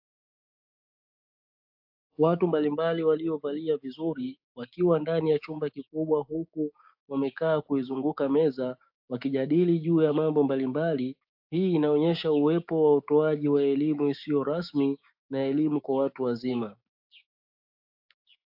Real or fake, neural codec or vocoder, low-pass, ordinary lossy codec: fake; codec, 16 kHz, 6 kbps, DAC; 5.4 kHz; AAC, 48 kbps